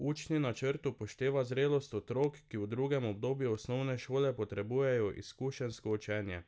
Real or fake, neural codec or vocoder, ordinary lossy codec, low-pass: real; none; none; none